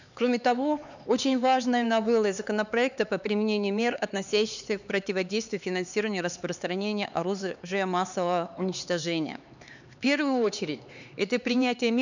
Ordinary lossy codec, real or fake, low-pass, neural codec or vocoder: none; fake; 7.2 kHz; codec, 16 kHz, 4 kbps, X-Codec, HuBERT features, trained on LibriSpeech